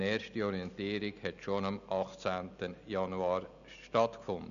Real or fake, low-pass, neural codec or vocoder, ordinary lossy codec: real; 7.2 kHz; none; none